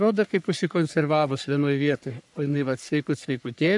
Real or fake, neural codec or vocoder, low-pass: fake; codec, 44.1 kHz, 3.4 kbps, Pupu-Codec; 10.8 kHz